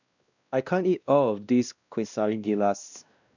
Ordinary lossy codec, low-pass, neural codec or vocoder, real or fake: none; 7.2 kHz; codec, 16 kHz, 1 kbps, X-Codec, WavLM features, trained on Multilingual LibriSpeech; fake